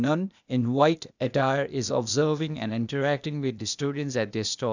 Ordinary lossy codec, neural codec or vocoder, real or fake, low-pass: none; codec, 16 kHz, 0.8 kbps, ZipCodec; fake; 7.2 kHz